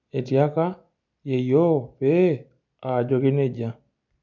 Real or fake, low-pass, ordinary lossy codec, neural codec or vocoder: real; 7.2 kHz; none; none